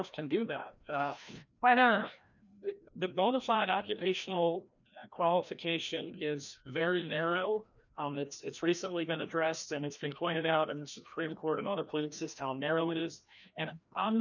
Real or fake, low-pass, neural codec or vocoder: fake; 7.2 kHz; codec, 16 kHz, 1 kbps, FreqCodec, larger model